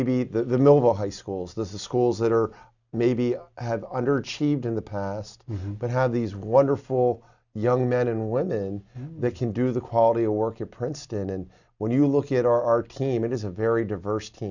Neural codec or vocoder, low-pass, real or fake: none; 7.2 kHz; real